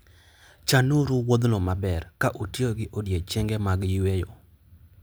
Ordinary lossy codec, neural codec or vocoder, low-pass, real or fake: none; none; none; real